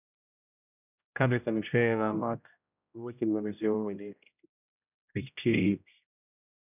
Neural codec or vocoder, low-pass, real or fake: codec, 16 kHz, 0.5 kbps, X-Codec, HuBERT features, trained on general audio; 3.6 kHz; fake